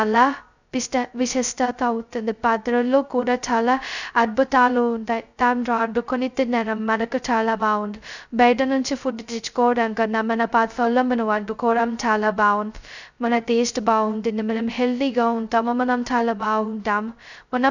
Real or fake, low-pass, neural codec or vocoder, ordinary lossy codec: fake; 7.2 kHz; codec, 16 kHz, 0.2 kbps, FocalCodec; none